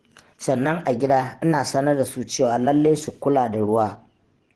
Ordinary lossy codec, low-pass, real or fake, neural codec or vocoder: Opus, 16 kbps; 10.8 kHz; fake; vocoder, 24 kHz, 100 mel bands, Vocos